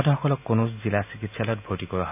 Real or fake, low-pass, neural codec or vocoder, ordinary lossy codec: real; 3.6 kHz; none; AAC, 32 kbps